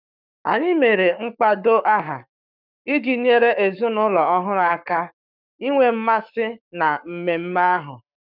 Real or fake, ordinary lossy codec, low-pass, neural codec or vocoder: fake; none; 5.4 kHz; codec, 44.1 kHz, 7.8 kbps, Pupu-Codec